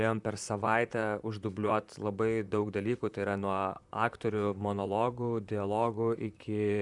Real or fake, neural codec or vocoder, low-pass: fake; vocoder, 44.1 kHz, 128 mel bands, Pupu-Vocoder; 10.8 kHz